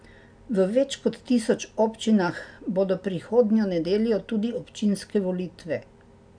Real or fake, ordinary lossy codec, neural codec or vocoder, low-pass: real; none; none; 9.9 kHz